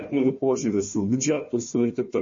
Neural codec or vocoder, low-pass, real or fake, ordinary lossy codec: codec, 16 kHz, 1 kbps, FunCodec, trained on Chinese and English, 50 frames a second; 7.2 kHz; fake; MP3, 32 kbps